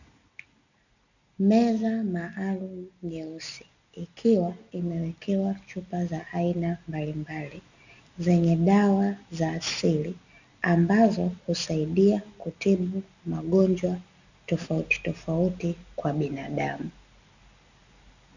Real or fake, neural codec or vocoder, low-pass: real; none; 7.2 kHz